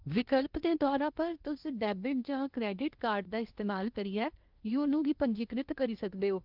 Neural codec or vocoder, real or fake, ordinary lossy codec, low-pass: codec, 16 kHz in and 24 kHz out, 0.8 kbps, FocalCodec, streaming, 65536 codes; fake; Opus, 24 kbps; 5.4 kHz